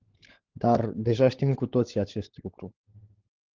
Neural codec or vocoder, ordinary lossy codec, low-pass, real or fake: codec, 16 kHz, 16 kbps, FunCodec, trained on LibriTTS, 50 frames a second; Opus, 16 kbps; 7.2 kHz; fake